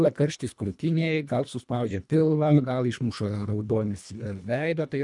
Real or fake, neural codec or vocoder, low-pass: fake; codec, 24 kHz, 1.5 kbps, HILCodec; 10.8 kHz